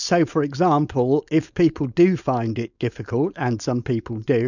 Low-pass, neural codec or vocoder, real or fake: 7.2 kHz; codec, 16 kHz, 4.8 kbps, FACodec; fake